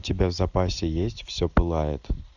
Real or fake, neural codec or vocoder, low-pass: real; none; 7.2 kHz